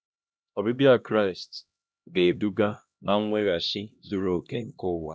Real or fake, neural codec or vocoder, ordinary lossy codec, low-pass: fake; codec, 16 kHz, 1 kbps, X-Codec, HuBERT features, trained on LibriSpeech; none; none